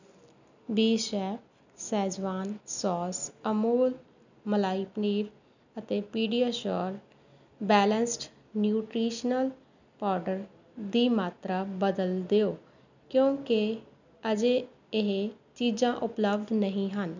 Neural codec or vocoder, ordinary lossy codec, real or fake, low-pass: none; none; real; 7.2 kHz